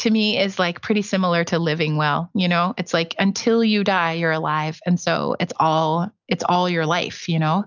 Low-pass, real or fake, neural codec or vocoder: 7.2 kHz; real; none